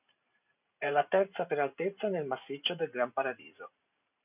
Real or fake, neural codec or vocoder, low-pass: fake; vocoder, 44.1 kHz, 128 mel bands every 512 samples, BigVGAN v2; 3.6 kHz